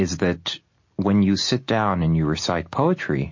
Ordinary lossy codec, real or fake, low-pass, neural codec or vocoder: MP3, 32 kbps; real; 7.2 kHz; none